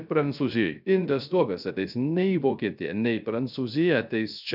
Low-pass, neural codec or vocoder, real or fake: 5.4 kHz; codec, 16 kHz, 0.3 kbps, FocalCodec; fake